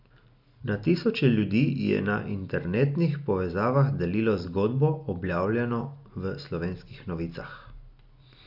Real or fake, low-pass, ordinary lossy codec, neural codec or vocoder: real; 5.4 kHz; none; none